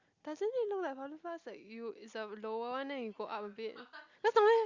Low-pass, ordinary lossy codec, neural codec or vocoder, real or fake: 7.2 kHz; Opus, 64 kbps; none; real